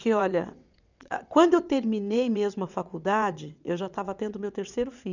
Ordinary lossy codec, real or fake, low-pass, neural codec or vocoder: none; fake; 7.2 kHz; vocoder, 22.05 kHz, 80 mel bands, WaveNeXt